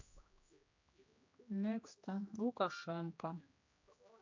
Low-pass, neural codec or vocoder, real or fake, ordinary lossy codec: 7.2 kHz; codec, 16 kHz, 1 kbps, X-Codec, HuBERT features, trained on general audio; fake; AAC, 48 kbps